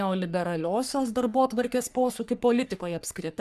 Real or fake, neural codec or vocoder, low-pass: fake; codec, 44.1 kHz, 3.4 kbps, Pupu-Codec; 14.4 kHz